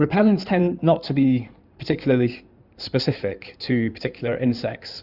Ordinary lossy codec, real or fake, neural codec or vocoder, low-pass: Opus, 64 kbps; fake; codec, 16 kHz in and 24 kHz out, 2.2 kbps, FireRedTTS-2 codec; 5.4 kHz